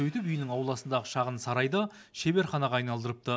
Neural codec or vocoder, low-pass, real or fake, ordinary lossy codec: none; none; real; none